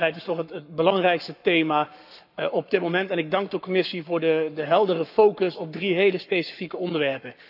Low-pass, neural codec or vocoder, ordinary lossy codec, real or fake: 5.4 kHz; codec, 44.1 kHz, 7.8 kbps, Pupu-Codec; none; fake